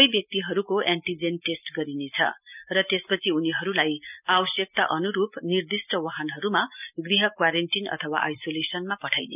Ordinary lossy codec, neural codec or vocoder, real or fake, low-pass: none; none; real; 3.6 kHz